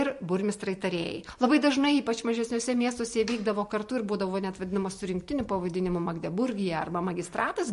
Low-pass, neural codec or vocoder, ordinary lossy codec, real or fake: 14.4 kHz; vocoder, 44.1 kHz, 128 mel bands every 512 samples, BigVGAN v2; MP3, 48 kbps; fake